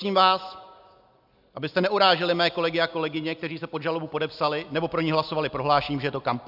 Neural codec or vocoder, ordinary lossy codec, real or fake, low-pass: none; AAC, 48 kbps; real; 5.4 kHz